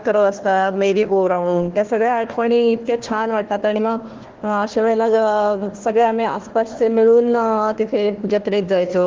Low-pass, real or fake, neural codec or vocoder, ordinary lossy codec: 7.2 kHz; fake; codec, 16 kHz, 1 kbps, FunCodec, trained on Chinese and English, 50 frames a second; Opus, 16 kbps